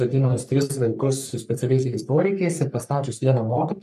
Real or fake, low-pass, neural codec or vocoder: fake; 14.4 kHz; codec, 44.1 kHz, 3.4 kbps, Pupu-Codec